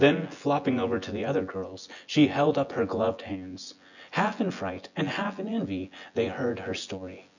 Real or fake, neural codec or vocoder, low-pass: fake; vocoder, 24 kHz, 100 mel bands, Vocos; 7.2 kHz